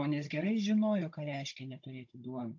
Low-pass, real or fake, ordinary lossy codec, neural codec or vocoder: 7.2 kHz; fake; AAC, 48 kbps; codec, 16 kHz, 16 kbps, FunCodec, trained on Chinese and English, 50 frames a second